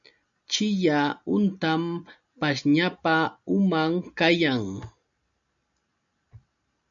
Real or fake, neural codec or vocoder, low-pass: real; none; 7.2 kHz